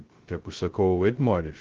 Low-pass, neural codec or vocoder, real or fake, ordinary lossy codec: 7.2 kHz; codec, 16 kHz, 0.2 kbps, FocalCodec; fake; Opus, 16 kbps